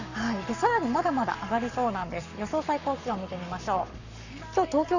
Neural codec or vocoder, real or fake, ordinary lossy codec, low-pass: codec, 44.1 kHz, 7.8 kbps, Pupu-Codec; fake; none; 7.2 kHz